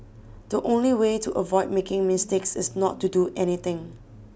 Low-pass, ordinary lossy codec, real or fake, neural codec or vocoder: none; none; real; none